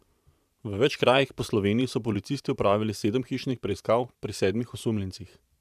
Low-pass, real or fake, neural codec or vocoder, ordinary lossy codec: 14.4 kHz; fake; vocoder, 44.1 kHz, 128 mel bands every 512 samples, BigVGAN v2; none